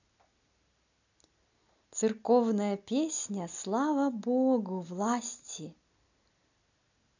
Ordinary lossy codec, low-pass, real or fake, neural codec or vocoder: none; 7.2 kHz; real; none